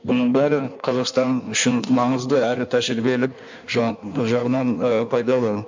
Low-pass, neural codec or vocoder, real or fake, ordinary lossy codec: 7.2 kHz; codec, 16 kHz in and 24 kHz out, 1.1 kbps, FireRedTTS-2 codec; fake; MP3, 64 kbps